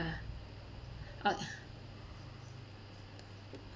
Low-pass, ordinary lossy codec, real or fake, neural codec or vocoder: none; none; real; none